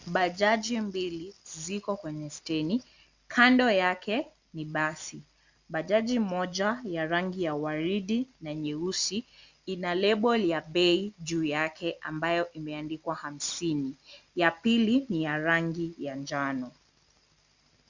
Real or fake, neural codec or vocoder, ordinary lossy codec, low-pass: real; none; Opus, 64 kbps; 7.2 kHz